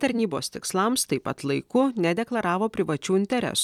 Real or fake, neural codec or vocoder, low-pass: fake; vocoder, 44.1 kHz, 128 mel bands every 256 samples, BigVGAN v2; 19.8 kHz